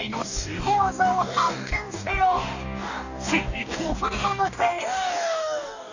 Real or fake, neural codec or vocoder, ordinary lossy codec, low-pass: fake; codec, 44.1 kHz, 2.6 kbps, DAC; none; 7.2 kHz